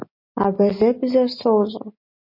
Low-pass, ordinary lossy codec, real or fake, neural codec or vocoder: 5.4 kHz; MP3, 32 kbps; real; none